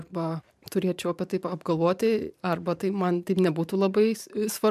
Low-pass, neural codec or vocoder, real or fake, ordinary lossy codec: 14.4 kHz; vocoder, 44.1 kHz, 128 mel bands every 512 samples, BigVGAN v2; fake; MP3, 96 kbps